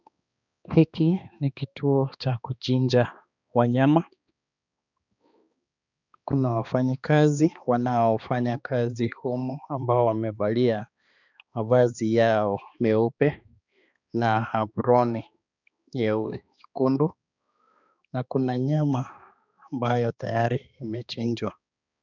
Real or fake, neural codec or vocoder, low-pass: fake; codec, 16 kHz, 2 kbps, X-Codec, HuBERT features, trained on balanced general audio; 7.2 kHz